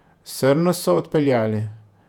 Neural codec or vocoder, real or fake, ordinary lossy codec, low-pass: vocoder, 48 kHz, 128 mel bands, Vocos; fake; none; 19.8 kHz